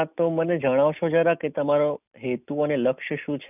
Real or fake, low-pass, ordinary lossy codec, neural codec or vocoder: real; 3.6 kHz; none; none